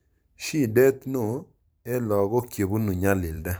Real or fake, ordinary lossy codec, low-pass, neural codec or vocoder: fake; none; none; vocoder, 44.1 kHz, 128 mel bands every 512 samples, BigVGAN v2